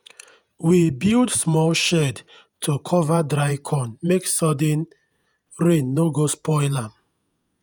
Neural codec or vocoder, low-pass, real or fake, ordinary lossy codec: vocoder, 48 kHz, 128 mel bands, Vocos; none; fake; none